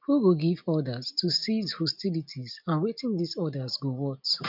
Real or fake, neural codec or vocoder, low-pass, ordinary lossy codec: real; none; 5.4 kHz; none